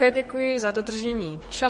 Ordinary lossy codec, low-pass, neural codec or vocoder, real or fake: MP3, 48 kbps; 14.4 kHz; codec, 44.1 kHz, 2.6 kbps, SNAC; fake